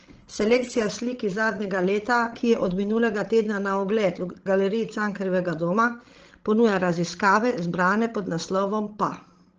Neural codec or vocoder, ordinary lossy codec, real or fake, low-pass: codec, 16 kHz, 16 kbps, FreqCodec, larger model; Opus, 16 kbps; fake; 7.2 kHz